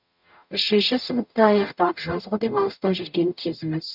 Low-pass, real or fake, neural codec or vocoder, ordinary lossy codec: 5.4 kHz; fake; codec, 44.1 kHz, 0.9 kbps, DAC; none